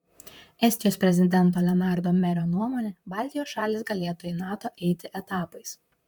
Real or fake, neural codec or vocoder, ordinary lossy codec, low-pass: fake; vocoder, 44.1 kHz, 128 mel bands, Pupu-Vocoder; MP3, 96 kbps; 19.8 kHz